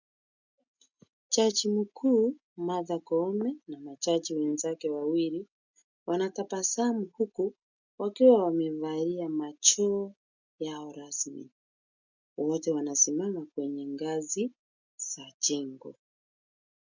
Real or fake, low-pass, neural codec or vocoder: real; 7.2 kHz; none